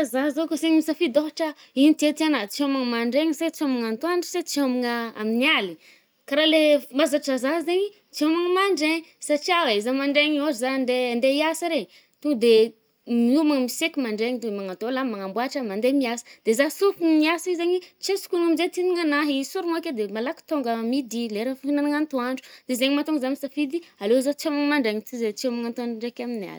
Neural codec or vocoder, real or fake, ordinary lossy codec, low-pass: none; real; none; none